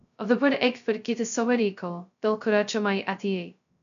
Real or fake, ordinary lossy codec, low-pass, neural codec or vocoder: fake; MP3, 96 kbps; 7.2 kHz; codec, 16 kHz, 0.2 kbps, FocalCodec